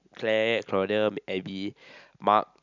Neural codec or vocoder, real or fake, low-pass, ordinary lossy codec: none; real; 7.2 kHz; none